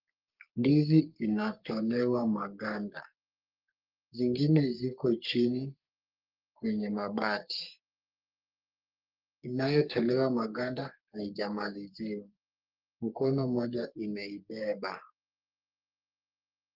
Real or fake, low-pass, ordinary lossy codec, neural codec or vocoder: fake; 5.4 kHz; Opus, 32 kbps; codec, 44.1 kHz, 3.4 kbps, Pupu-Codec